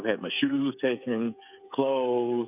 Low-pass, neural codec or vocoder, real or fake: 3.6 kHz; codec, 16 kHz, 8 kbps, FreqCodec, smaller model; fake